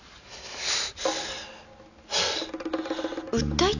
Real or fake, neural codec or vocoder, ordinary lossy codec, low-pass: real; none; none; 7.2 kHz